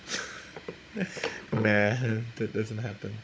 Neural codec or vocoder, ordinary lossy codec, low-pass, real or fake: codec, 16 kHz, 16 kbps, FunCodec, trained on Chinese and English, 50 frames a second; none; none; fake